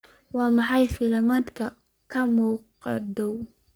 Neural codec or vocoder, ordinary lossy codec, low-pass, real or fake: codec, 44.1 kHz, 3.4 kbps, Pupu-Codec; none; none; fake